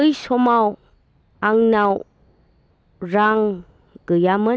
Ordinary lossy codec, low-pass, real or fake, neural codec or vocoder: none; none; real; none